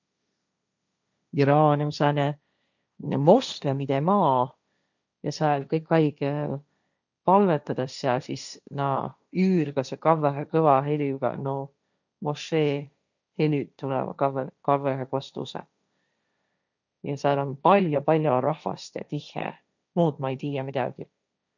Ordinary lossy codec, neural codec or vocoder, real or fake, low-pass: none; codec, 16 kHz, 1.1 kbps, Voila-Tokenizer; fake; 7.2 kHz